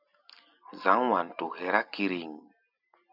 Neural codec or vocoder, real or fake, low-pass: none; real; 5.4 kHz